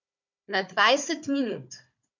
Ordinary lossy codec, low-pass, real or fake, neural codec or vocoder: none; 7.2 kHz; fake; codec, 16 kHz, 4 kbps, FunCodec, trained on Chinese and English, 50 frames a second